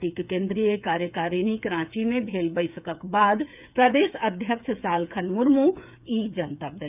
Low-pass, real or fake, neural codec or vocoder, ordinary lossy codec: 3.6 kHz; fake; codec, 16 kHz, 8 kbps, FreqCodec, smaller model; none